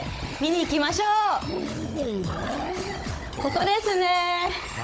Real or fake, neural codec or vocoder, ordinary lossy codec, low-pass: fake; codec, 16 kHz, 16 kbps, FunCodec, trained on Chinese and English, 50 frames a second; none; none